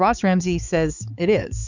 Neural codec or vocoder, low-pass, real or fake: codec, 16 kHz, 4 kbps, X-Codec, HuBERT features, trained on balanced general audio; 7.2 kHz; fake